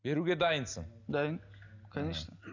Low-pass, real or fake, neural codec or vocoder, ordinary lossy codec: 7.2 kHz; real; none; none